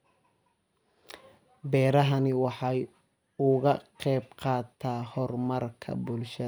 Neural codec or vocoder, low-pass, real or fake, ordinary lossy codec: none; none; real; none